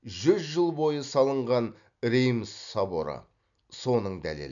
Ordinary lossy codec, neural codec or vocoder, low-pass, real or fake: none; none; 7.2 kHz; real